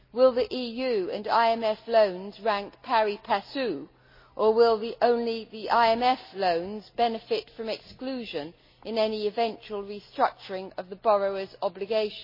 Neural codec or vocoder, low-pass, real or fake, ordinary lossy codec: none; 5.4 kHz; real; MP3, 24 kbps